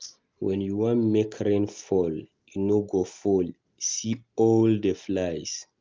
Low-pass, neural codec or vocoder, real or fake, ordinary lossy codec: 7.2 kHz; none; real; Opus, 32 kbps